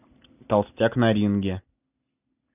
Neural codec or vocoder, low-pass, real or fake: none; 3.6 kHz; real